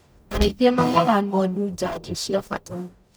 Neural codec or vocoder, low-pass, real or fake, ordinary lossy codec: codec, 44.1 kHz, 0.9 kbps, DAC; none; fake; none